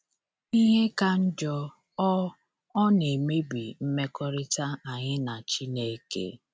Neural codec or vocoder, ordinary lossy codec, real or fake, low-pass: none; none; real; none